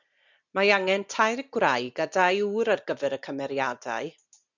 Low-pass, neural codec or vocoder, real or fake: 7.2 kHz; none; real